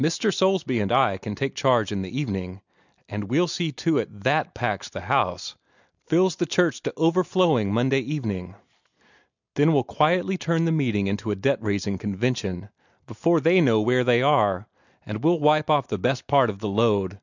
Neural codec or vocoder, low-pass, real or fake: none; 7.2 kHz; real